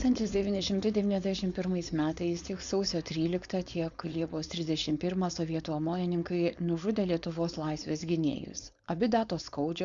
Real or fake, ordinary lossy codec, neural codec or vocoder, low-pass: fake; Opus, 64 kbps; codec, 16 kHz, 4.8 kbps, FACodec; 7.2 kHz